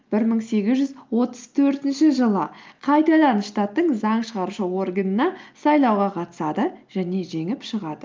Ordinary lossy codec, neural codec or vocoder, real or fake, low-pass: Opus, 24 kbps; none; real; 7.2 kHz